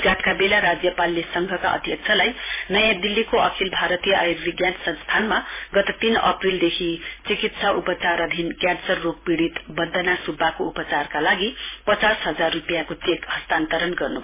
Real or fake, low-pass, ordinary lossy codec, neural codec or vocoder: fake; 3.6 kHz; MP3, 16 kbps; vocoder, 44.1 kHz, 128 mel bands every 512 samples, BigVGAN v2